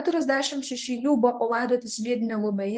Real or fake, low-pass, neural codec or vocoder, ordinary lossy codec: fake; 9.9 kHz; codec, 24 kHz, 0.9 kbps, WavTokenizer, medium speech release version 1; Opus, 24 kbps